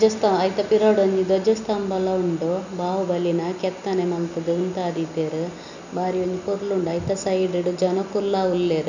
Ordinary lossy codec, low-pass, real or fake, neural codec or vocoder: none; 7.2 kHz; real; none